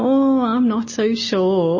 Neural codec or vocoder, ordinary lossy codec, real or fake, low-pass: none; MP3, 32 kbps; real; 7.2 kHz